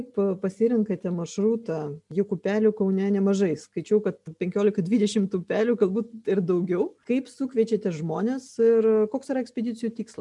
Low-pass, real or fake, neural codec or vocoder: 10.8 kHz; real; none